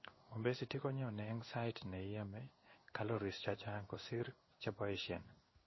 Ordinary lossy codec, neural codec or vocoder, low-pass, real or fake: MP3, 24 kbps; codec, 16 kHz in and 24 kHz out, 1 kbps, XY-Tokenizer; 7.2 kHz; fake